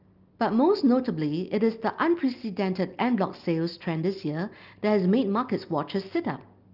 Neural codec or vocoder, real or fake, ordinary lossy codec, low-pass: none; real; Opus, 24 kbps; 5.4 kHz